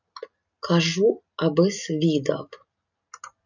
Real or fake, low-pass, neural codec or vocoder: real; 7.2 kHz; none